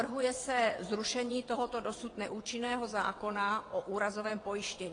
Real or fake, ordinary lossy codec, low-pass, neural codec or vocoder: fake; AAC, 32 kbps; 9.9 kHz; vocoder, 22.05 kHz, 80 mel bands, WaveNeXt